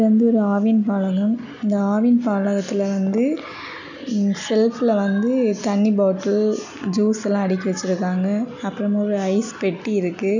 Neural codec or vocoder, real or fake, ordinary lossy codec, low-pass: none; real; none; 7.2 kHz